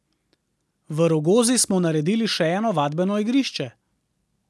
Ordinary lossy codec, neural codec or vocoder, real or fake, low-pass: none; none; real; none